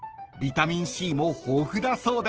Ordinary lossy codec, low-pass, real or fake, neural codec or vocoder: Opus, 16 kbps; 7.2 kHz; real; none